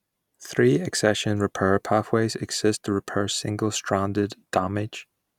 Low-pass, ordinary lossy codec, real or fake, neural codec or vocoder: 19.8 kHz; none; real; none